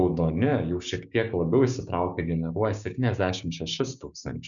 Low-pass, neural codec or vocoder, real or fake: 7.2 kHz; codec, 16 kHz, 6 kbps, DAC; fake